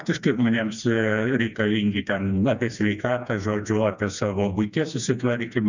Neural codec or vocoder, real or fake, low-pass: codec, 16 kHz, 2 kbps, FreqCodec, smaller model; fake; 7.2 kHz